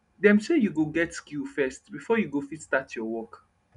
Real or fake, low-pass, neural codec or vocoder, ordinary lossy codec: real; 10.8 kHz; none; none